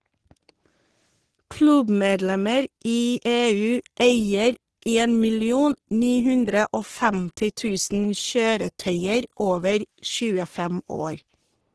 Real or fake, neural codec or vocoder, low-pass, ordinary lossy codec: fake; codec, 44.1 kHz, 3.4 kbps, Pupu-Codec; 10.8 kHz; Opus, 16 kbps